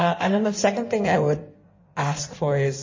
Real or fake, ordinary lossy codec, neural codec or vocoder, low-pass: fake; MP3, 32 kbps; codec, 16 kHz in and 24 kHz out, 1.1 kbps, FireRedTTS-2 codec; 7.2 kHz